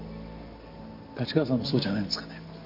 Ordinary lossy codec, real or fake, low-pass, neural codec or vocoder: MP3, 32 kbps; real; 5.4 kHz; none